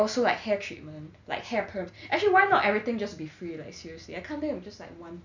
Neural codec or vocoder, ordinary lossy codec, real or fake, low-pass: none; none; real; 7.2 kHz